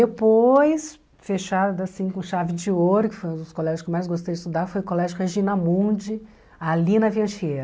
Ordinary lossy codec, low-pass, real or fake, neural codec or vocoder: none; none; real; none